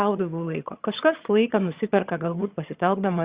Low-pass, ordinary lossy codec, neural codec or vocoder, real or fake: 3.6 kHz; Opus, 64 kbps; vocoder, 22.05 kHz, 80 mel bands, HiFi-GAN; fake